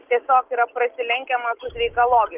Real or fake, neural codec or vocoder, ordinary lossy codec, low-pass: real; none; Opus, 32 kbps; 3.6 kHz